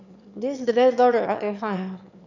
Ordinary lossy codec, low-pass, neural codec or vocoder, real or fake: none; 7.2 kHz; autoencoder, 22.05 kHz, a latent of 192 numbers a frame, VITS, trained on one speaker; fake